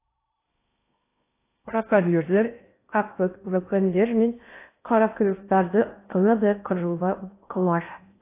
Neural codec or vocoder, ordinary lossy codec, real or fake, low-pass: codec, 16 kHz in and 24 kHz out, 0.6 kbps, FocalCodec, streaming, 4096 codes; AAC, 24 kbps; fake; 3.6 kHz